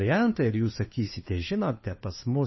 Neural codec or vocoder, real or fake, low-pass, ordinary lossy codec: codec, 16 kHz, 2 kbps, FunCodec, trained on Chinese and English, 25 frames a second; fake; 7.2 kHz; MP3, 24 kbps